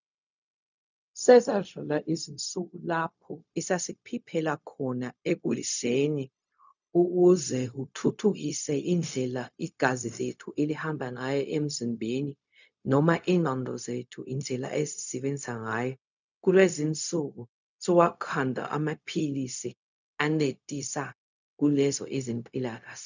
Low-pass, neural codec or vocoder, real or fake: 7.2 kHz; codec, 16 kHz, 0.4 kbps, LongCat-Audio-Codec; fake